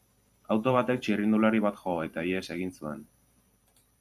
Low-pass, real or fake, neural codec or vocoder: 14.4 kHz; fake; vocoder, 44.1 kHz, 128 mel bands every 512 samples, BigVGAN v2